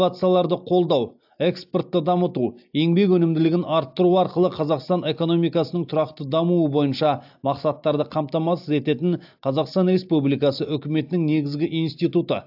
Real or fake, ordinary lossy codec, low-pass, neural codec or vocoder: real; AAC, 48 kbps; 5.4 kHz; none